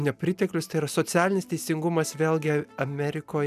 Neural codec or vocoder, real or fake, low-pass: none; real; 14.4 kHz